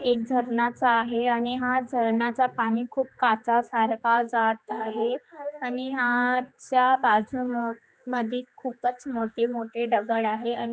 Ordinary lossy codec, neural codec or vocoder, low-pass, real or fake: none; codec, 16 kHz, 2 kbps, X-Codec, HuBERT features, trained on general audio; none; fake